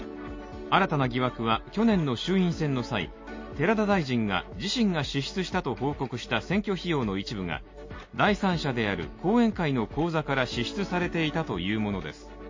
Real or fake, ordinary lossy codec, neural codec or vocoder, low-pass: real; MP3, 32 kbps; none; 7.2 kHz